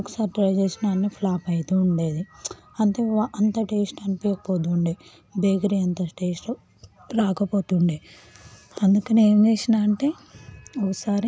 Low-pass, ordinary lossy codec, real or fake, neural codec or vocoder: none; none; real; none